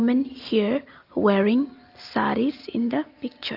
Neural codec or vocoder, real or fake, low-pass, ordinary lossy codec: none; real; 5.4 kHz; Opus, 24 kbps